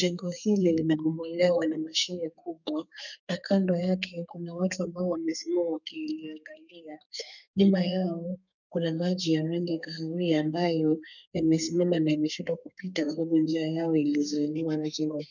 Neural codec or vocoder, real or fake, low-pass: codec, 32 kHz, 1.9 kbps, SNAC; fake; 7.2 kHz